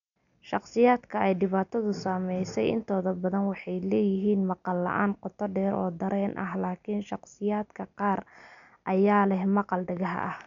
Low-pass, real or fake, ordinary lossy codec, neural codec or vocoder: 7.2 kHz; real; none; none